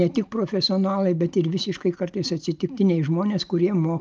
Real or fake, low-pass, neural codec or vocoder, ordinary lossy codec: real; 7.2 kHz; none; Opus, 32 kbps